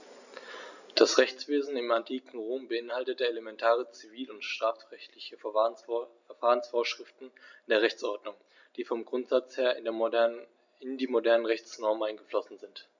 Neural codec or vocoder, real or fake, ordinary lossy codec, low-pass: none; real; none; 7.2 kHz